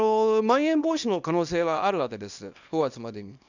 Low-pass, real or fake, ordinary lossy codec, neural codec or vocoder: 7.2 kHz; fake; none; codec, 24 kHz, 0.9 kbps, WavTokenizer, small release